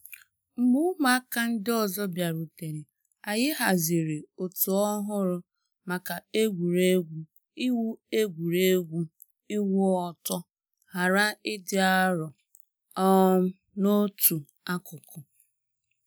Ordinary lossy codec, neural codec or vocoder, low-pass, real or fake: none; none; none; real